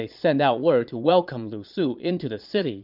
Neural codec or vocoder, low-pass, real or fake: vocoder, 22.05 kHz, 80 mel bands, Vocos; 5.4 kHz; fake